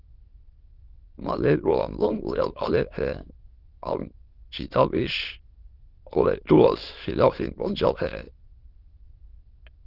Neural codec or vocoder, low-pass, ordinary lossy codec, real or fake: autoencoder, 22.05 kHz, a latent of 192 numbers a frame, VITS, trained on many speakers; 5.4 kHz; Opus, 16 kbps; fake